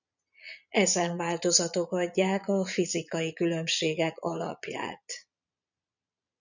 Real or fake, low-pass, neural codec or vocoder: fake; 7.2 kHz; vocoder, 22.05 kHz, 80 mel bands, Vocos